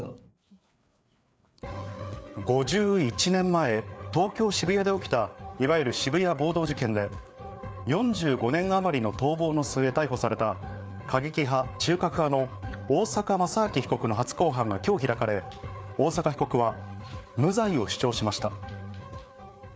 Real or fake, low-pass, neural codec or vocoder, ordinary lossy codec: fake; none; codec, 16 kHz, 4 kbps, FreqCodec, larger model; none